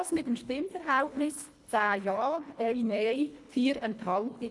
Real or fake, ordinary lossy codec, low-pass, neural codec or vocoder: fake; none; none; codec, 24 kHz, 1.5 kbps, HILCodec